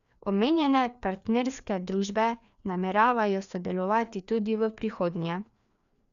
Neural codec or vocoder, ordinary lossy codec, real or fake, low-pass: codec, 16 kHz, 2 kbps, FreqCodec, larger model; none; fake; 7.2 kHz